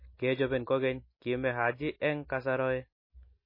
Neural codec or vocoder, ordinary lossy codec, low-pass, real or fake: none; MP3, 24 kbps; 5.4 kHz; real